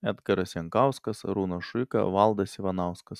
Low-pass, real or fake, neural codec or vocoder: 14.4 kHz; real; none